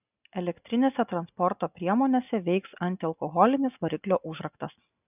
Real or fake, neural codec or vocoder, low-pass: real; none; 3.6 kHz